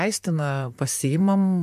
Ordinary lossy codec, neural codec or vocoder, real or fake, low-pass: MP3, 96 kbps; vocoder, 44.1 kHz, 128 mel bands, Pupu-Vocoder; fake; 14.4 kHz